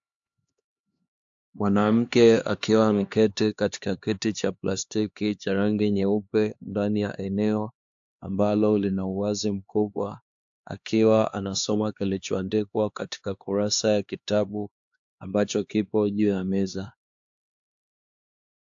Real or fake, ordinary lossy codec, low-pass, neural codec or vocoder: fake; AAC, 64 kbps; 7.2 kHz; codec, 16 kHz, 2 kbps, X-Codec, HuBERT features, trained on LibriSpeech